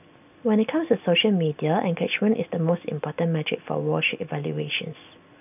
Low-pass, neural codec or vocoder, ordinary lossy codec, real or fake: 3.6 kHz; none; none; real